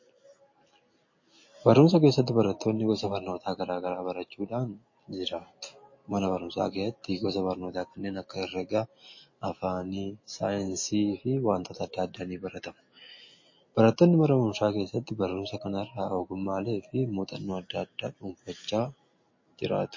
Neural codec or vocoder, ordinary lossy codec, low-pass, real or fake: none; MP3, 32 kbps; 7.2 kHz; real